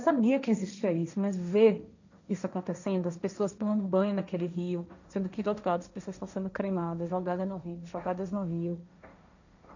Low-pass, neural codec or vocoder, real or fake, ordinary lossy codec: 7.2 kHz; codec, 16 kHz, 1.1 kbps, Voila-Tokenizer; fake; none